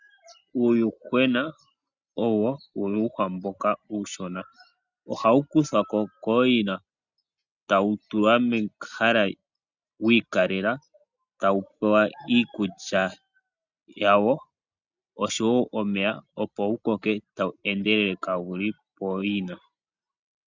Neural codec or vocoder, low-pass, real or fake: none; 7.2 kHz; real